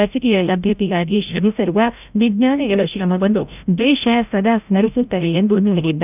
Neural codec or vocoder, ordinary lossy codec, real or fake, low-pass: codec, 16 kHz, 0.5 kbps, FreqCodec, larger model; none; fake; 3.6 kHz